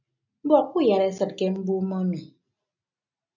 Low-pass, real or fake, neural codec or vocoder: 7.2 kHz; real; none